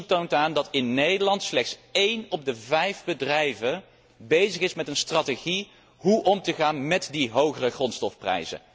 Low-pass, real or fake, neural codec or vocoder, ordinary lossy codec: none; real; none; none